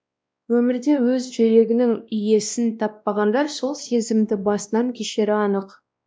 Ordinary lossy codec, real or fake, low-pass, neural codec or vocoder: none; fake; none; codec, 16 kHz, 1 kbps, X-Codec, WavLM features, trained on Multilingual LibriSpeech